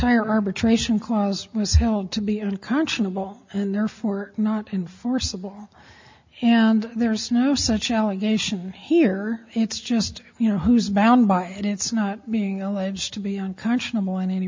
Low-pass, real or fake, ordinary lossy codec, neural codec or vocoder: 7.2 kHz; real; MP3, 64 kbps; none